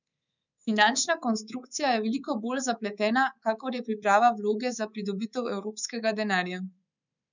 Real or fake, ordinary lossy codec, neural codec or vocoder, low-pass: fake; none; codec, 24 kHz, 3.1 kbps, DualCodec; 7.2 kHz